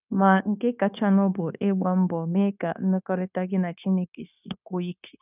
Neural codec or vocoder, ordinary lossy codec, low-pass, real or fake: codec, 16 kHz, 0.9 kbps, LongCat-Audio-Codec; none; 3.6 kHz; fake